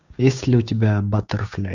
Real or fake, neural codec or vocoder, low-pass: fake; codec, 16 kHz, 6 kbps, DAC; 7.2 kHz